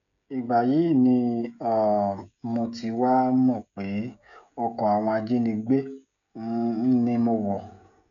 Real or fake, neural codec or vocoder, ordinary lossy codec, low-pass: fake; codec, 16 kHz, 16 kbps, FreqCodec, smaller model; MP3, 96 kbps; 7.2 kHz